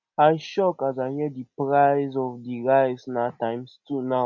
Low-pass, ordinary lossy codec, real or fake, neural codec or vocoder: 7.2 kHz; none; real; none